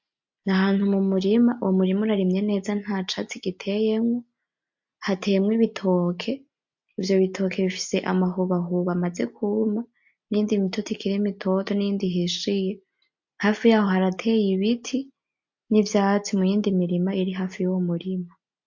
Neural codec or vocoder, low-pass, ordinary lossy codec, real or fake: none; 7.2 kHz; MP3, 48 kbps; real